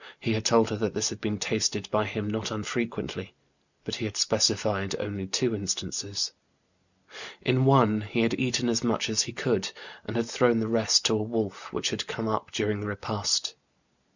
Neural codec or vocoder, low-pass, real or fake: none; 7.2 kHz; real